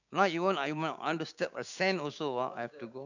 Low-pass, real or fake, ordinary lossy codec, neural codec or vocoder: 7.2 kHz; fake; none; codec, 16 kHz, 6 kbps, DAC